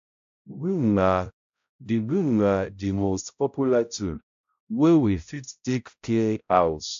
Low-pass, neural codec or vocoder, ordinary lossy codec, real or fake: 7.2 kHz; codec, 16 kHz, 0.5 kbps, X-Codec, HuBERT features, trained on balanced general audio; AAC, 64 kbps; fake